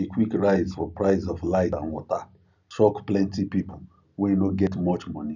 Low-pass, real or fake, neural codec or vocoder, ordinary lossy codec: 7.2 kHz; real; none; none